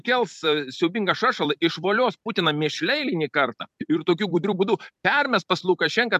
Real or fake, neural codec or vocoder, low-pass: fake; vocoder, 44.1 kHz, 128 mel bands every 256 samples, BigVGAN v2; 14.4 kHz